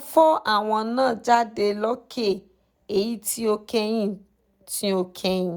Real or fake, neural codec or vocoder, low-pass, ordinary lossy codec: real; none; none; none